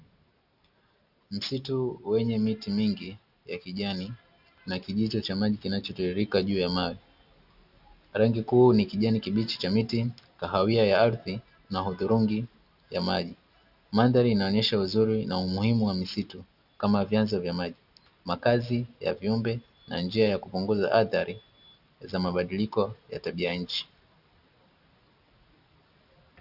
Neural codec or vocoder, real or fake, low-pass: none; real; 5.4 kHz